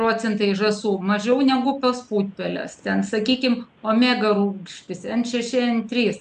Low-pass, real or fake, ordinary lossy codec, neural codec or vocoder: 9.9 kHz; real; AAC, 96 kbps; none